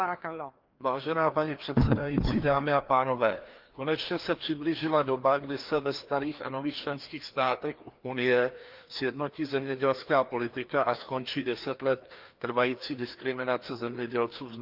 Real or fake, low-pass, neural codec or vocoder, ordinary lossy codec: fake; 5.4 kHz; codec, 16 kHz, 2 kbps, FreqCodec, larger model; Opus, 32 kbps